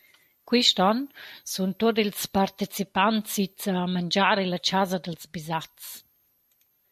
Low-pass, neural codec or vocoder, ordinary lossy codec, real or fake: 14.4 kHz; none; MP3, 64 kbps; real